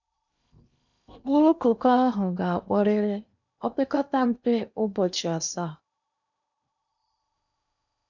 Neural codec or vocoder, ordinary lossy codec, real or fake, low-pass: codec, 16 kHz in and 24 kHz out, 0.8 kbps, FocalCodec, streaming, 65536 codes; none; fake; 7.2 kHz